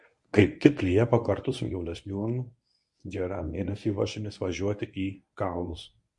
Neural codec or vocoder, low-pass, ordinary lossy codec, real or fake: codec, 24 kHz, 0.9 kbps, WavTokenizer, medium speech release version 1; 10.8 kHz; MP3, 48 kbps; fake